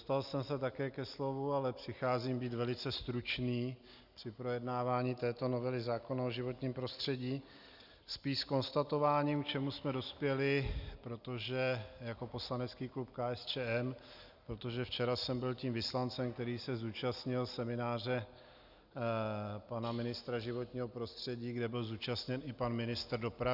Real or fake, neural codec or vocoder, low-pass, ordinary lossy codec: real; none; 5.4 kHz; Opus, 64 kbps